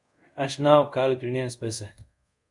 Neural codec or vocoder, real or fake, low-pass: codec, 24 kHz, 0.5 kbps, DualCodec; fake; 10.8 kHz